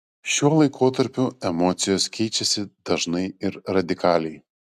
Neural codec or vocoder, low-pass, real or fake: none; 14.4 kHz; real